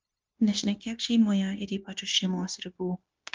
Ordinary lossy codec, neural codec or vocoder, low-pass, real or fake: Opus, 32 kbps; codec, 16 kHz, 0.9 kbps, LongCat-Audio-Codec; 7.2 kHz; fake